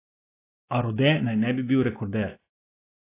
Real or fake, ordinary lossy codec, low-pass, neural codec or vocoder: real; AAC, 24 kbps; 3.6 kHz; none